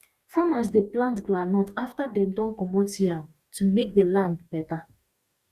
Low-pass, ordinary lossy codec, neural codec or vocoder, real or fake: 14.4 kHz; Opus, 64 kbps; codec, 44.1 kHz, 2.6 kbps, DAC; fake